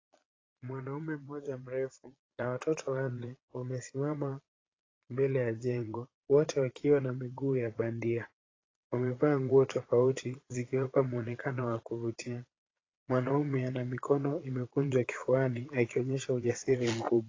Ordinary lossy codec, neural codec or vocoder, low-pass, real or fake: AAC, 32 kbps; vocoder, 22.05 kHz, 80 mel bands, Vocos; 7.2 kHz; fake